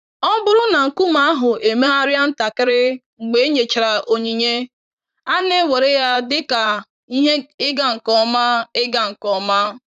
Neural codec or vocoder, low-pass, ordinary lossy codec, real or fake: vocoder, 44.1 kHz, 128 mel bands, Pupu-Vocoder; 14.4 kHz; none; fake